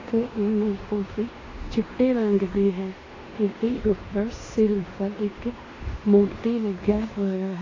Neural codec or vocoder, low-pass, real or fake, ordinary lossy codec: codec, 16 kHz in and 24 kHz out, 0.9 kbps, LongCat-Audio-Codec, fine tuned four codebook decoder; 7.2 kHz; fake; AAC, 32 kbps